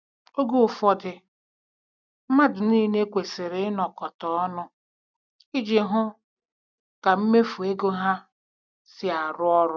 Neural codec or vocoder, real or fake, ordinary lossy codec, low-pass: none; real; none; 7.2 kHz